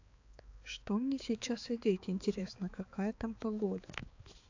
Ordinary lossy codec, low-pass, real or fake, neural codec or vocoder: AAC, 48 kbps; 7.2 kHz; fake; codec, 16 kHz, 4 kbps, X-Codec, HuBERT features, trained on balanced general audio